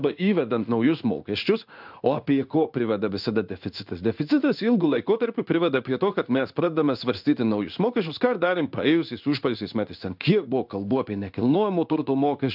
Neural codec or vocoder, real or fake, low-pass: codec, 16 kHz in and 24 kHz out, 1 kbps, XY-Tokenizer; fake; 5.4 kHz